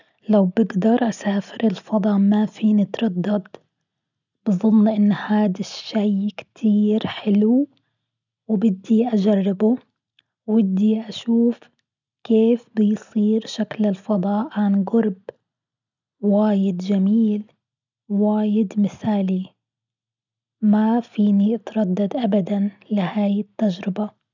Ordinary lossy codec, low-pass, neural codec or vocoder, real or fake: none; 7.2 kHz; none; real